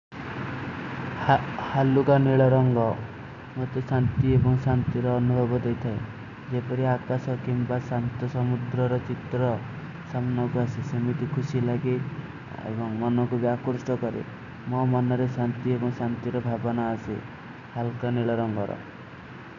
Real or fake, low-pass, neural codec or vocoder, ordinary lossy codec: real; 7.2 kHz; none; none